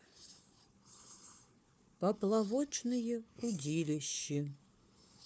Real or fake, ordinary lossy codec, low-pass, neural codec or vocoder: fake; none; none; codec, 16 kHz, 4 kbps, FunCodec, trained on Chinese and English, 50 frames a second